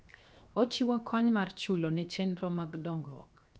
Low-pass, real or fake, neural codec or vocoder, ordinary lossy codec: none; fake; codec, 16 kHz, 0.7 kbps, FocalCodec; none